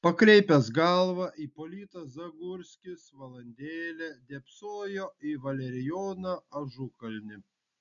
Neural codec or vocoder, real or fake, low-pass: none; real; 7.2 kHz